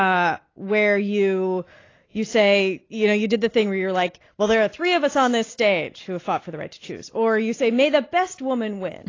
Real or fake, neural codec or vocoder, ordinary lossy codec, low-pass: real; none; AAC, 32 kbps; 7.2 kHz